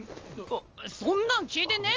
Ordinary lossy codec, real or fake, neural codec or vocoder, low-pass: Opus, 24 kbps; real; none; 7.2 kHz